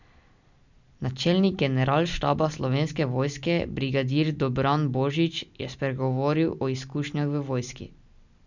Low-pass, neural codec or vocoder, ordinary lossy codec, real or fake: 7.2 kHz; none; none; real